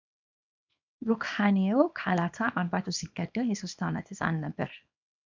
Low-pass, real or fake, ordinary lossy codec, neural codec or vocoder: 7.2 kHz; fake; MP3, 64 kbps; codec, 24 kHz, 0.9 kbps, WavTokenizer, small release